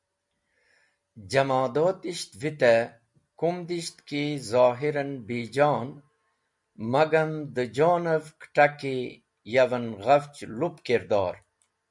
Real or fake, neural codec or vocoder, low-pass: real; none; 10.8 kHz